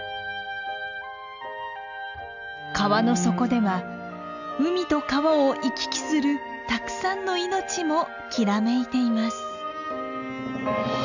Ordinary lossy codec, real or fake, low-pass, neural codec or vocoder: none; real; 7.2 kHz; none